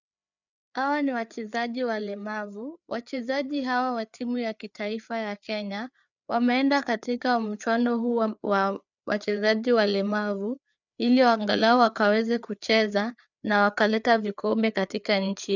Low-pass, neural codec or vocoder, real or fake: 7.2 kHz; codec, 16 kHz, 4 kbps, FreqCodec, larger model; fake